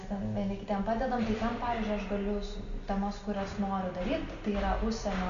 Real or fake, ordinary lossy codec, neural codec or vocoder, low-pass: real; Opus, 64 kbps; none; 7.2 kHz